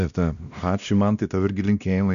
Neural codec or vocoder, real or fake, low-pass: codec, 16 kHz, 1 kbps, X-Codec, WavLM features, trained on Multilingual LibriSpeech; fake; 7.2 kHz